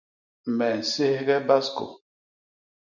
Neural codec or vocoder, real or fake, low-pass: none; real; 7.2 kHz